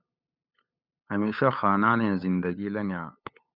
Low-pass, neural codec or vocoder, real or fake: 5.4 kHz; codec, 16 kHz, 8 kbps, FunCodec, trained on LibriTTS, 25 frames a second; fake